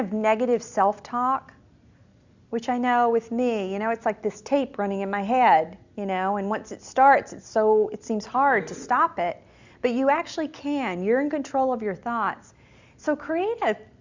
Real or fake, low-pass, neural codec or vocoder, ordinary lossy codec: real; 7.2 kHz; none; Opus, 64 kbps